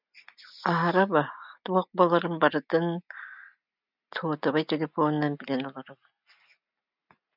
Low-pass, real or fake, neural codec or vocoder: 5.4 kHz; real; none